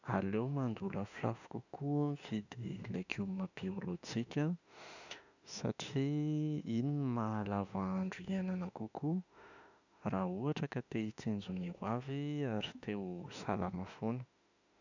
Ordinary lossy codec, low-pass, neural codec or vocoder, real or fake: none; 7.2 kHz; autoencoder, 48 kHz, 32 numbers a frame, DAC-VAE, trained on Japanese speech; fake